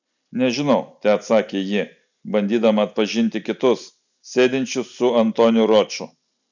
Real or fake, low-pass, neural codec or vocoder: real; 7.2 kHz; none